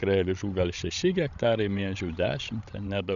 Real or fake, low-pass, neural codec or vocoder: fake; 7.2 kHz; codec, 16 kHz, 16 kbps, FreqCodec, larger model